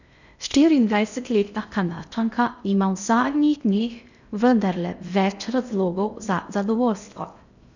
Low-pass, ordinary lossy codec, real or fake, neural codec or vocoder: 7.2 kHz; none; fake; codec, 16 kHz in and 24 kHz out, 0.6 kbps, FocalCodec, streaming, 2048 codes